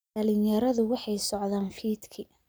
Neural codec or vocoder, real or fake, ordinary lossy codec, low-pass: none; real; none; none